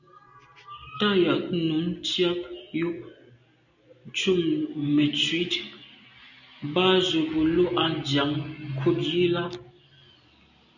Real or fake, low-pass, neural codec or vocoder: real; 7.2 kHz; none